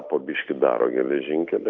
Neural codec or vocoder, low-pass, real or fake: none; 7.2 kHz; real